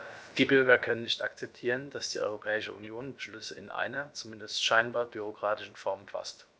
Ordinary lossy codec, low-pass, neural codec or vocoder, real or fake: none; none; codec, 16 kHz, about 1 kbps, DyCAST, with the encoder's durations; fake